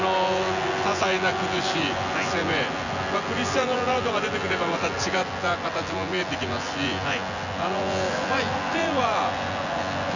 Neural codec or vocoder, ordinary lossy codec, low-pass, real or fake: vocoder, 24 kHz, 100 mel bands, Vocos; none; 7.2 kHz; fake